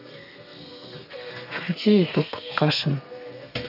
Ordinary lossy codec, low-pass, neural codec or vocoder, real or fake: none; 5.4 kHz; codec, 32 kHz, 1.9 kbps, SNAC; fake